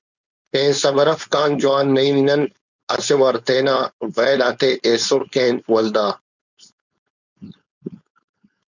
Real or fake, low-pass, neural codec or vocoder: fake; 7.2 kHz; codec, 16 kHz, 4.8 kbps, FACodec